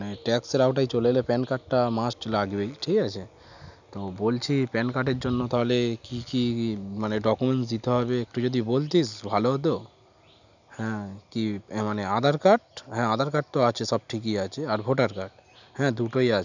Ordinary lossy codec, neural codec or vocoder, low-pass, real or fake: none; none; 7.2 kHz; real